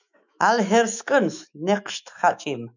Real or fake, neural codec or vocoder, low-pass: fake; autoencoder, 48 kHz, 128 numbers a frame, DAC-VAE, trained on Japanese speech; 7.2 kHz